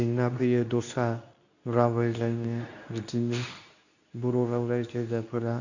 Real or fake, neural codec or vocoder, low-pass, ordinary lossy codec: fake; codec, 24 kHz, 0.9 kbps, WavTokenizer, medium speech release version 2; 7.2 kHz; none